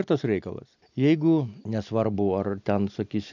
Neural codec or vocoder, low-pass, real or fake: none; 7.2 kHz; real